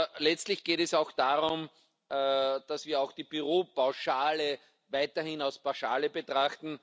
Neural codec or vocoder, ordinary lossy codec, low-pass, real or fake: none; none; none; real